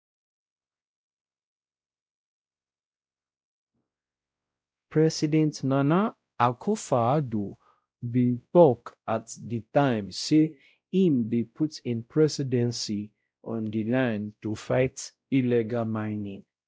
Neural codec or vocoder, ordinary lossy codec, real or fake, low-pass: codec, 16 kHz, 0.5 kbps, X-Codec, WavLM features, trained on Multilingual LibriSpeech; none; fake; none